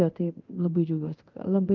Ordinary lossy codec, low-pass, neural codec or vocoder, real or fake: Opus, 32 kbps; 7.2 kHz; codec, 24 kHz, 0.9 kbps, DualCodec; fake